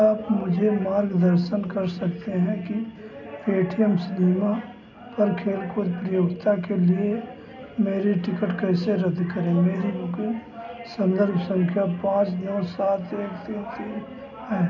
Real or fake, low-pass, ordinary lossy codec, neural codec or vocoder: real; 7.2 kHz; none; none